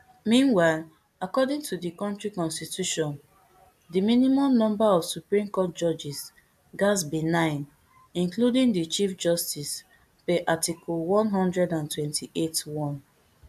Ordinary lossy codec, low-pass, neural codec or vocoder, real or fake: AAC, 96 kbps; 14.4 kHz; none; real